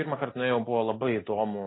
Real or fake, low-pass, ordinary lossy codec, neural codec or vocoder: real; 7.2 kHz; AAC, 16 kbps; none